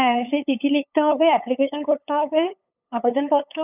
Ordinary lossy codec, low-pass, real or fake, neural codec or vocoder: none; 3.6 kHz; fake; codec, 16 kHz, 8 kbps, FunCodec, trained on LibriTTS, 25 frames a second